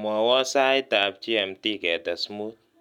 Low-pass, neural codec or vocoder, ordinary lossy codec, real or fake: 19.8 kHz; none; none; real